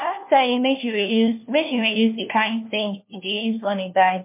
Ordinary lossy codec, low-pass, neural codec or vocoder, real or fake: MP3, 32 kbps; 3.6 kHz; codec, 16 kHz, 1 kbps, FunCodec, trained on LibriTTS, 50 frames a second; fake